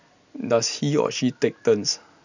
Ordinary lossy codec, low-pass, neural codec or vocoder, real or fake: none; 7.2 kHz; none; real